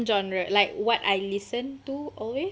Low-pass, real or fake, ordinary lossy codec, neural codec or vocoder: none; real; none; none